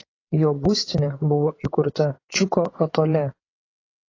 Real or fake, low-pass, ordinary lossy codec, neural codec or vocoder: fake; 7.2 kHz; AAC, 32 kbps; vocoder, 22.05 kHz, 80 mel bands, WaveNeXt